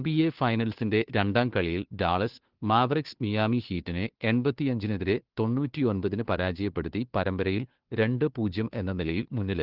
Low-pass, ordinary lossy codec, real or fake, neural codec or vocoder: 5.4 kHz; Opus, 16 kbps; fake; codec, 16 kHz, 2 kbps, FunCodec, trained on Chinese and English, 25 frames a second